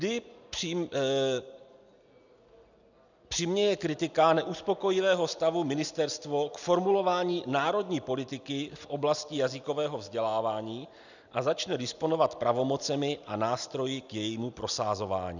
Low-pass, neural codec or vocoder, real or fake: 7.2 kHz; none; real